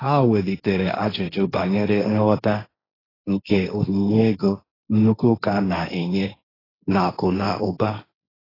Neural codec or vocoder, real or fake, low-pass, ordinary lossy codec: codec, 16 kHz, 1.1 kbps, Voila-Tokenizer; fake; 5.4 kHz; AAC, 24 kbps